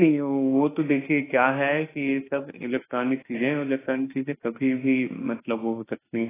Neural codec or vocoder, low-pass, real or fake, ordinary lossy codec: autoencoder, 48 kHz, 32 numbers a frame, DAC-VAE, trained on Japanese speech; 3.6 kHz; fake; AAC, 16 kbps